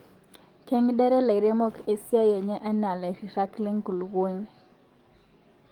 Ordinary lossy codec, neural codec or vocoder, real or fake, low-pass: Opus, 24 kbps; codec, 44.1 kHz, 7.8 kbps, DAC; fake; 19.8 kHz